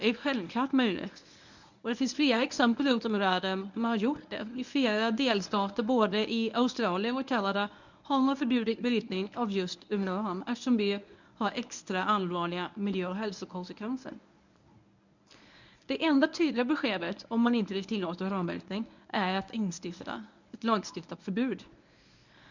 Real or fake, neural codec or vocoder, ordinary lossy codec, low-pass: fake; codec, 24 kHz, 0.9 kbps, WavTokenizer, medium speech release version 1; none; 7.2 kHz